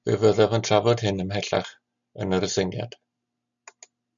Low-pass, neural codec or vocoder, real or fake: 7.2 kHz; none; real